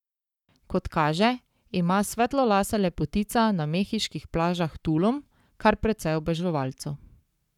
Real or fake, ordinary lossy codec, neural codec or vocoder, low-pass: fake; none; codec, 44.1 kHz, 7.8 kbps, Pupu-Codec; 19.8 kHz